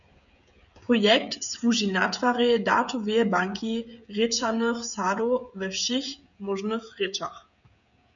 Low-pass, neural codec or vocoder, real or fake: 7.2 kHz; codec, 16 kHz, 16 kbps, FreqCodec, smaller model; fake